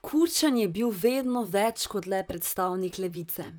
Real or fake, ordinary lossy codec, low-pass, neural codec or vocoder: fake; none; none; vocoder, 44.1 kHz, 128 mel bands, Pupu-Vocoder